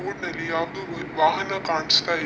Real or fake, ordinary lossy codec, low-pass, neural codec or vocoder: real; none; none; none